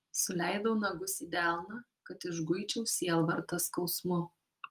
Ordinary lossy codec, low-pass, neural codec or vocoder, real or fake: Opus, 32 kbps; 14.4 kHz; none; real